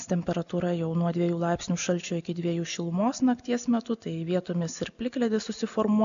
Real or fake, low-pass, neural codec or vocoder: real; 7.2 kHz; none